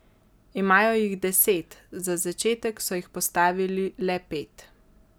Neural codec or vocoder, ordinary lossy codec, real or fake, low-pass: none; none; real; none